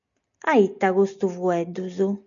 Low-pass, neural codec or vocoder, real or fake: 7.2 kHz; none; real